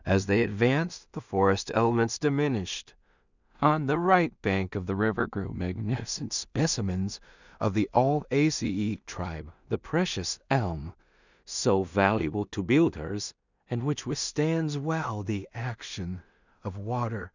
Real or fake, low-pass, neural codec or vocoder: fake; 7.2 kHz; codec, 16 kHz in and 24 kHz out, 0.4 kbps, LongCat-Audio-Codec, two codebook decoder